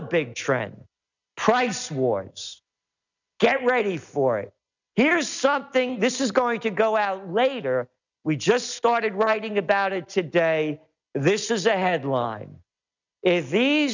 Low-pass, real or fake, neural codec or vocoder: 7.2 kHz; real; none